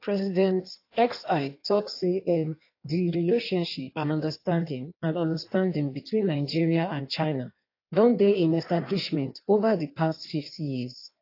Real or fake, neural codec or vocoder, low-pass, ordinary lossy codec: fake; codec, 16 kHz in and 24 kHz out, 1.1 kbps, FireRedTTS-2 codec; 5.4 kHz; AAC, 32 kbps